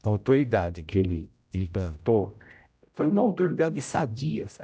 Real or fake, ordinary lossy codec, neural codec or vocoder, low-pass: fake; none; codec, 16 kHz, 0.5 kbps, X-Codec, HuBERT features, trained on general audio; none